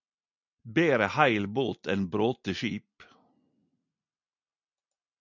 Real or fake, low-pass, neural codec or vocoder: real; 7.2 kHz; none